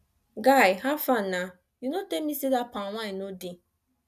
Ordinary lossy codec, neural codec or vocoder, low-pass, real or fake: none; none; 14.4 kHz; real